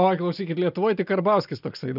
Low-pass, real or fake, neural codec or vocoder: 5.4 kHz; real; none